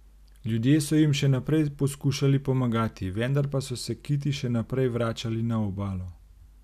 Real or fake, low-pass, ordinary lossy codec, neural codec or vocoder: real; 14.4 kHz; none; none